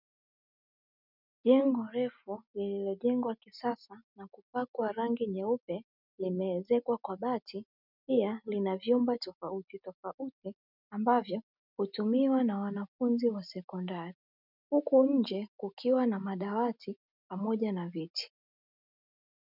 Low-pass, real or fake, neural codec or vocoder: 5.4 kHz; real; none